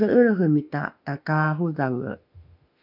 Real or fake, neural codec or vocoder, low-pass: fake; autoencoder, 48 kHz, 32 numbers a frame, DAC-VAE, trained on Japanese speech; 5.4 kHz